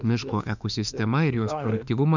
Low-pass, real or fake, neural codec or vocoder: 7.2 kHz; fake; autoencoder, 48 kHz, 32 numbers a frame, DAC-VAE, trained on Japanese speech